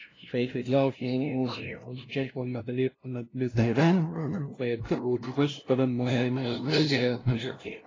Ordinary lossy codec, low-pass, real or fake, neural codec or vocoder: AAC, 32 kbps; 7.2 kHz; fake; codec, 16 kHz, 0.5 kbps, FunCodec, trained on LibriTTS, 25 frames a second